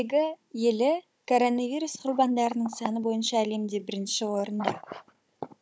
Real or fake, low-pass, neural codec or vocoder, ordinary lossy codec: fake; none; codec, 16 kHz, 16 kbps, FunCodec, trained on Chinese and English, 50 frames a second; none